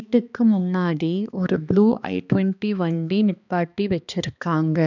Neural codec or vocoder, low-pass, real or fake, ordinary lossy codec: codec, 16 kHz, 2 kbps, X-Codec, HuBERT features, trained on balanced general audio; 7.2 kHz; fake; none